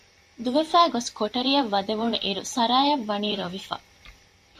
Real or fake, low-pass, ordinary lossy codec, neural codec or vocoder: fake; 14.4 kHz; AAC, 96 kbps; vocoder, 44.1 kHz, 128 mel bands every 512 samples, BigVGAN v2